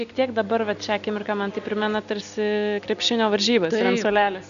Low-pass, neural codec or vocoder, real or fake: 7.2 kHz; none; real